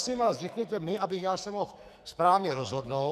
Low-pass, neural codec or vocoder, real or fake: 14.4 kHz; codec, 44.1 kHz, 2.6 kbps, SNAC; fake